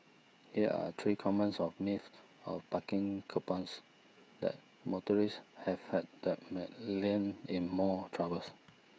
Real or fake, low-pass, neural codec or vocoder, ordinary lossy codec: fake; none; codec, 16 kHz, 16 kbps, FreqCodec, smaller model; none